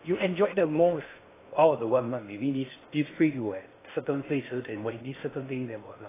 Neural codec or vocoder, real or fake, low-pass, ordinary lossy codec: codec, 16 kHz in and 24 kHz out, 0.6 kbps, FocalCodec, streaming, 2048 codes; fake; 3.6 kHz; AAC, 16 kbps